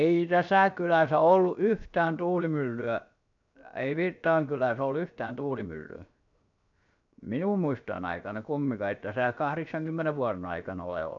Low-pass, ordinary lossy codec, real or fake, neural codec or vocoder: 7.2 kHz; none; fake; codec, 16 kHz, 0.7 kbps, FocalCodec